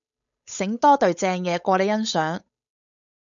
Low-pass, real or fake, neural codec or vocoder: 7.2 kHz; fake; codec, 16 kHz, 8 kbps, FunCodec, trained on Chinese and English, 25 frames a second